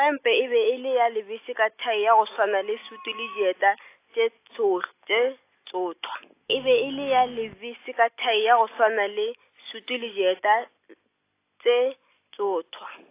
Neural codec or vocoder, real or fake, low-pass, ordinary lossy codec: none; real; 3.6 kHz; AAC, 24 kbps